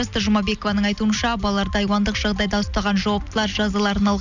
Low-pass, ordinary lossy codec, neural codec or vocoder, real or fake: 7.2 kHz; none; none; real